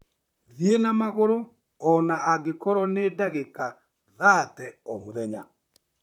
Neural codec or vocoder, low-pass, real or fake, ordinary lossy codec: vocoder, 44.1 kHz, 128 mel bands, Pupu-Vocoder; 19.8 kHz; fake; none